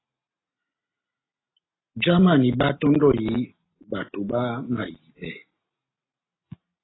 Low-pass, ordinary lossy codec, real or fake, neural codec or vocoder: 7.2 kHz; AAC, 16 kbps; real; none